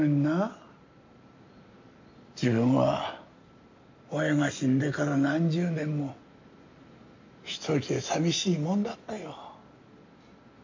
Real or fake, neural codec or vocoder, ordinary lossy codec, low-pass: real; none; MP3, 64 kbps; 7.2 kHz